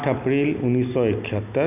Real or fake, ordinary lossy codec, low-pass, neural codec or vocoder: real; none; 3.6 kHz; none